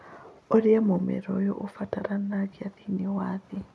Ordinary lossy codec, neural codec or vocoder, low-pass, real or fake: none; none; none; real